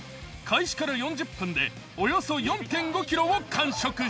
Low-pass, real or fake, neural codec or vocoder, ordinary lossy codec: none; real; none; none